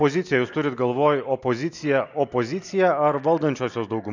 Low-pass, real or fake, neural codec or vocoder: 7.2 kHz; real; none